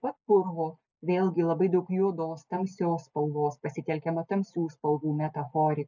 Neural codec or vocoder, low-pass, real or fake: none; 7.2 kHz; real